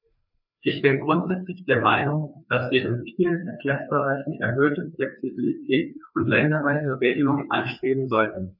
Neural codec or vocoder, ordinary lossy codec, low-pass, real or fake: codec, 16 kHz, 2 kbps, FreqCodec, larger model; none; 5.4 kHz; fake